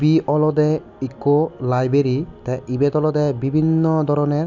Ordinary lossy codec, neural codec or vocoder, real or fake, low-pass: none; none; real; 7.2 kHz